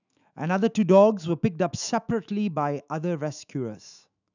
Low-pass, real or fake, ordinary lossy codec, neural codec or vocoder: 7.2 kHz; fake; none; autoencoder, 48 kHz, 128 numbers a frame, DAC-VAE, trained on Japanese speech